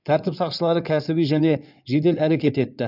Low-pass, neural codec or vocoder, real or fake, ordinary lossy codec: 5.4 kHz; codec, 16 kHz in and 24 kHz out, 2.2 kbps, FireRedTTS-2 codec; fake; none